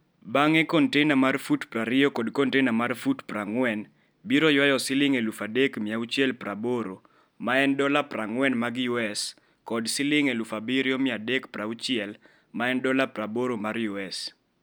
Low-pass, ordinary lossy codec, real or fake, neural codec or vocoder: none; none; real; none